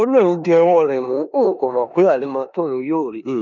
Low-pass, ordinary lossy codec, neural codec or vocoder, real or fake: 7.2 kHz; none; codec, 16 kHz in and 24 kHz out, 0.9 kbps, LongCat-Audio-Codec, four codebook decoder; fake